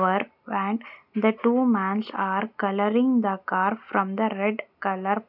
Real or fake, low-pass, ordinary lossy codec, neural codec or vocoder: real; 5.4 kHz; none; none